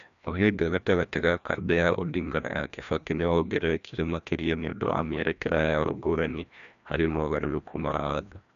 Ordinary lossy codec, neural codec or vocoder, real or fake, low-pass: none; codec, 16 kHz, 1 kbps, FreqCodec, larger model; fake; 7.2 kHz